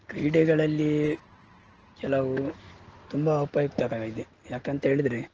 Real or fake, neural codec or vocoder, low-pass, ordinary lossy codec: real; none; 7.2 kHz; Opus, 16 kbps